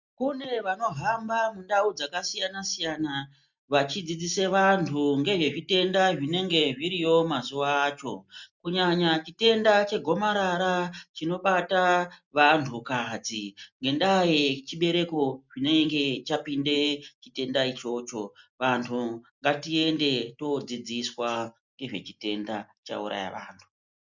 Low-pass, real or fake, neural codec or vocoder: 7.2 kHz; real; none